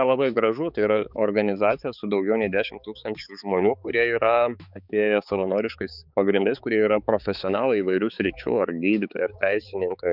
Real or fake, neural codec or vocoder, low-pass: fake; codec, 16 kHz, 4 kbps, X-Codec, HuBERT features, trained on balanced general audio; 5.4 kHz